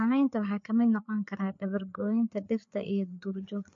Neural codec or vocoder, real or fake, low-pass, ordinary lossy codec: codec, 16 kHz, 4 kbps, FreqCodec, larger model; fake; 7.2 kHz; MP3, 48 kbps